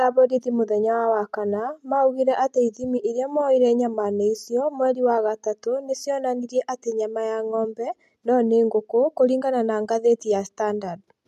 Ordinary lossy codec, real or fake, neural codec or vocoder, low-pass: MP3, 64 kbps; real; none; 14.4 kHz